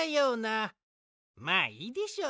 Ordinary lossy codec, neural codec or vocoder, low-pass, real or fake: none; none; none; real